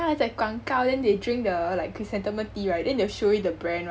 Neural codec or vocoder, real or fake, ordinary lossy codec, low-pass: none; real; none; none